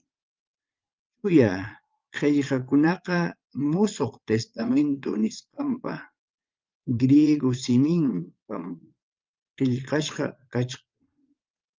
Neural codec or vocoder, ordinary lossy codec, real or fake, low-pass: vocoder, 22.05 kHz, 80 mel bands, Vocos; Opus, 24 kbps; fake; 7.2 kHz